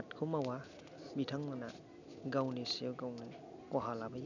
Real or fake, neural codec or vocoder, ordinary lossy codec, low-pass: real; none; none; 7.2 kHz